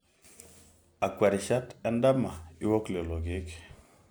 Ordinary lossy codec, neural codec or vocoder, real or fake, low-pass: none; none; real; none